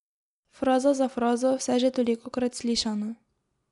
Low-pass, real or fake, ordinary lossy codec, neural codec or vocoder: 10.8 kHz; real; none; none